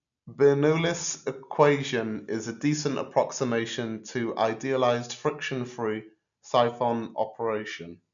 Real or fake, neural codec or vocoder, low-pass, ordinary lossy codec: real; none; 7.2 kHz; none